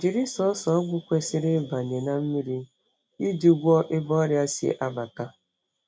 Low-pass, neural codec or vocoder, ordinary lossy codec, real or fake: none; none; none; real